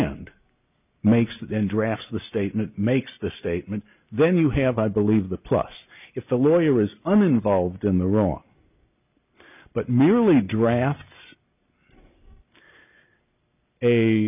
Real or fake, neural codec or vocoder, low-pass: real; none; 3.6 kHz